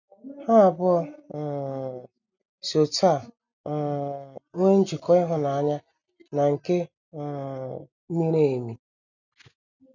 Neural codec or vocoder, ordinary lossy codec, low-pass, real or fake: none; none; 7.2 kHz; real